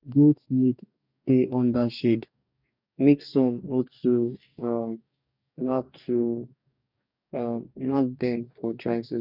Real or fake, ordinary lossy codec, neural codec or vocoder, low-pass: fake; none; codec, 44.1 kHz, 2.6 kbps, DAC; 5.4 kHz